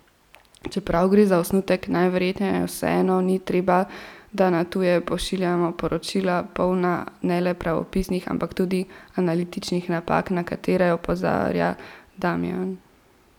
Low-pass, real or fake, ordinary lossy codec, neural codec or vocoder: 19.8 kHz; real; none; none